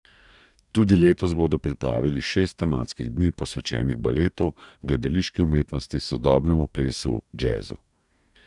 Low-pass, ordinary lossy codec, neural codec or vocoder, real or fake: 10.8 kHz; none; codec, 44.1 kHz, 2.6 kbps, DAC; fake